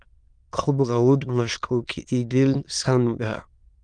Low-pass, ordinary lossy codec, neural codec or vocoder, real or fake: 9.9 kHz; Opus, 32 kbps; autoencoder, 22.05 kHz, a latent of 192 numbers a frame, VITS, trained on many speakers; fake